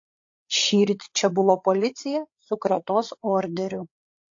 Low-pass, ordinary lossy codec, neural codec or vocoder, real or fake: 7.2 kHz; AAC, 48 kbps; codec, 16 kHz, 8 kbps, FreqCodec, larger model; fake